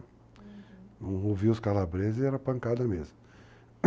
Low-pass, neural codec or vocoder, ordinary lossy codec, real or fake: none; none; none; real